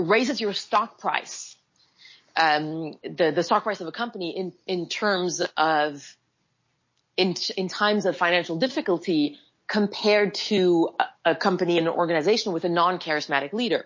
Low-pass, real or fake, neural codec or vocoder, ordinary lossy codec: 7.2 kHz; real; none; MP3, 32 kbps